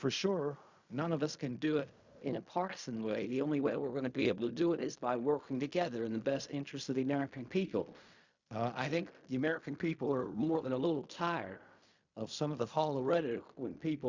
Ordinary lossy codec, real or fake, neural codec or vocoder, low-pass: Opus, 64 kbps; fake; codec, 16 kHz in and 24 kHz out, 0.4 kbps, LongCat-Audio-Codec, fine tuned four codebook decoder; 7.2 kHz